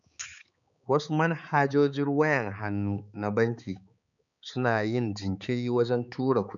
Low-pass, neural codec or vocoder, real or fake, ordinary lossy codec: 7.2 kHz; codec, 16 kHz, 4 kbps, X-Codec, HuBERT features, trained on balanced general audio; fake; none